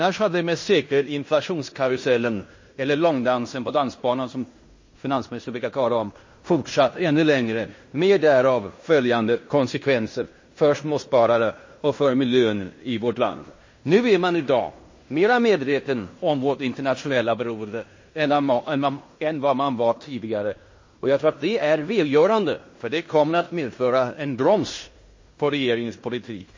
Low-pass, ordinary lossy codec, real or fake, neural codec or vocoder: 7.2 kHz; MP3, 32 kbps; fake; codec, 16 kHz in and 24 kHz out, 0.9 kbps, LongCat-Audio-Codec, fine tuned four codebook decoder